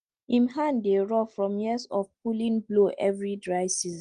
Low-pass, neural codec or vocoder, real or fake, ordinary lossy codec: 9.9 kHz; none; real; Opus, 24 kbps